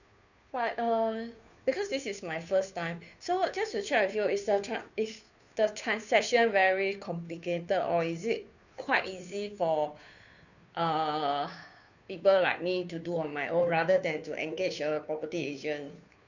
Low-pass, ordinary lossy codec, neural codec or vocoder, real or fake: 7.2 kHz; none; codec, 16 kHz, 2 kbps, FunCodec, trained on Chinese and English, 25 frames a second; fake